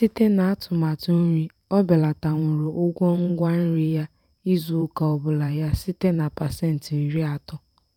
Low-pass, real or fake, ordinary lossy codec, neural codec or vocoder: 19.8 kHz; fake; none; vocoder, 44.1 kHz, 128 mel bands every 512 samples, BigVGAN v2